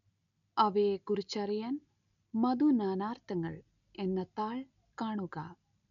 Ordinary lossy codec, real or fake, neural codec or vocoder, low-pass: none; real; none; 7.2 kHz